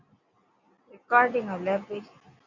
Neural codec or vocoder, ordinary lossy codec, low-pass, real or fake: none; Opus, 64 kbps; 7.2 kHz; real